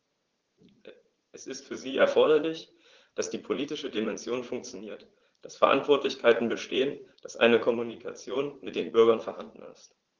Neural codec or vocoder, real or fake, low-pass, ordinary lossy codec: vocoder, 44.1 kHz, 80 mel bands, Vocos; fake; 7.2 kHz; Opus, 16 kbps